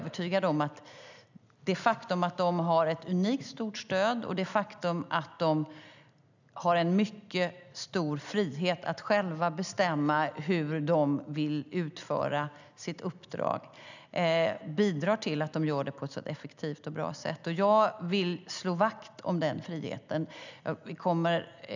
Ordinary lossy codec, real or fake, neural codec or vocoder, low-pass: none; real; none; 7.2 kHz